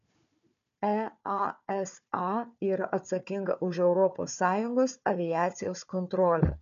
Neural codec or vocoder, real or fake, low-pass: codec, 16 kHz, 4 kbps, FunCodec, trained on Chinese and English, 50 frames a second; fake; 7.2 kHz